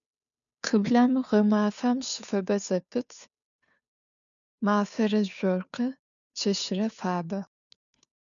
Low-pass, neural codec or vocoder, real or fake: 7.2 kHz; codec, 16 kHz, 2 kbps, FunCodec, trained on Chinese and English, 25 frames a second; fake